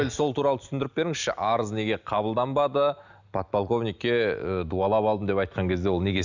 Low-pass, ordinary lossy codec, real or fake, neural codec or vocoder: 7.2 kHz; none; real; none